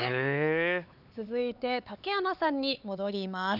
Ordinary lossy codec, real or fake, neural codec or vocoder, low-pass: none; fake; codec, 16 kHz, 4 kbps, X-Codec, HuBERT features, trained on LibriSpeech; 5.4 kHz